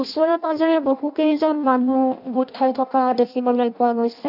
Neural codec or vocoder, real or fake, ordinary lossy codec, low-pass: codec, 16 kHz in and 24 kHz out, 0.6 kbps, FireRedTTS-2 codec; fake; none; 5.4 kHz